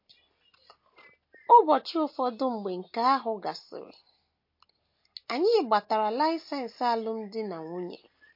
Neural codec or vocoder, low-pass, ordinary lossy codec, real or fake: none; 5.4 kHz; MP3, 32 kbps; real